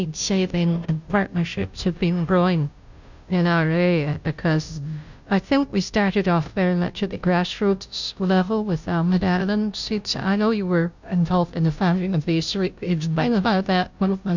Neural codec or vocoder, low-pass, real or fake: codec, 16 kHz, 0.5 kbps, FunCodec, trained on Chinese and English, 25 frames a second; 7.2 kHz; fake